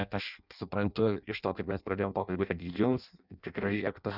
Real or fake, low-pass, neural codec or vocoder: fake; 5.4 kHz; codec, 16 kHz in and 24 kHz out, 0.6 kbps, FireRedTTS-2 codec